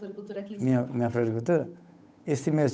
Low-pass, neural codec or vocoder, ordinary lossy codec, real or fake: none; codec, 16 kHz, 8 kbps, FunCodec, trained on Chinese and English, 25 frames a second; none; fake